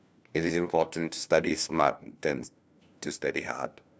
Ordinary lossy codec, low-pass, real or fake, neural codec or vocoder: none; none; fake; codec, 16 kHz, 1 kbps, FunCodec, trained on LibriTTS, 50 frames a second